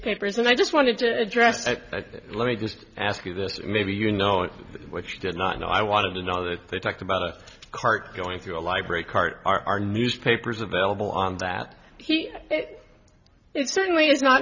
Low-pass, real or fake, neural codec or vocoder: 7.2 kHz; real; none